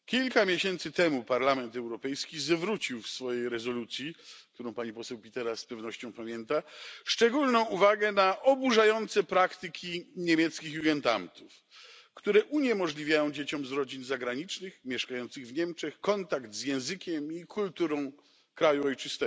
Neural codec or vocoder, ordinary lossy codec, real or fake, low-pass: none; none; real; none